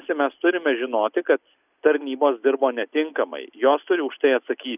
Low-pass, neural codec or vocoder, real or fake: 3.6 kHz; none; real